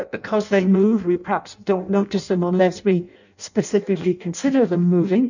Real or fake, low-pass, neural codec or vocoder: fake; 7.2 kHz; codec, 16 kHz in and 24 kHz out, 0.6 kbps, FireRedTTS-2 codec